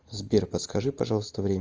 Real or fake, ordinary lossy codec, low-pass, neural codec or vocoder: real; Opus, 24 kbps; 7.2 kHz; none